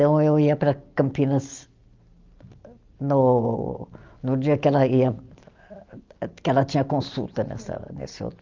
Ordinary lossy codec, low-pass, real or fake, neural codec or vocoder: Opus, 24 kbps; 7.2 kHz; real; none